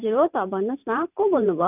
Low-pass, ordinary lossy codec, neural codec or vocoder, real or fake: 3.6 kHz; none; vocoder, 44.1 kHz, 128 mel bands every 512 samples, BigVGAN v2; fake